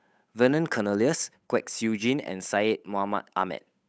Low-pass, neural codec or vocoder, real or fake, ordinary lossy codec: none; codec, 16 kHz, 8 kbps, FunCodec, trained on Chinese and English, 25 frames a second; fake; none